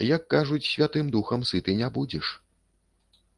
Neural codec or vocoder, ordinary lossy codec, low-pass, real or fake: none; Opus, 24 kbps; 10.8 kHz; real